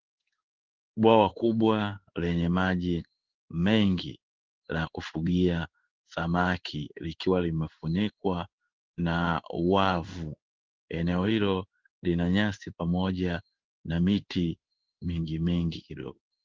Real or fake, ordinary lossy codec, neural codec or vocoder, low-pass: fake; Opus, 16 kbps; codec, 16 kHz in and 24 kHz out, 1 kbps, XY-Tokenizer; 7.2 kHz